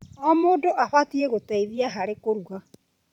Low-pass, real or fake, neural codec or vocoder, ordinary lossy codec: 19.8 kHz; fake; vocoder, 44.1 kHz, 128 mel bands every 512 samples, BigVGAN v2; none